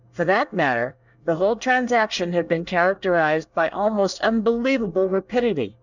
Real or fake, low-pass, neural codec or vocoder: fake; 7.2 kHz; codec, 24 kHz, 1 kbps, SNAC